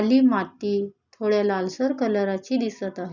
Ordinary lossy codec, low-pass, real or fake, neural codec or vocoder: none; 7.2 kHz; real; none